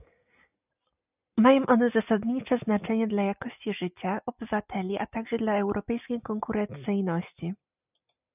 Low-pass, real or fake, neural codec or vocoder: 3.6 kHz; real; none